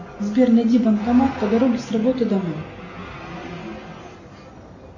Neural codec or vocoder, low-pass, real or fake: none; 7.2 kHz; real